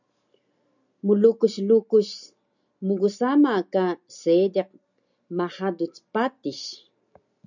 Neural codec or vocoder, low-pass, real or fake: none; 7.2 kHz; real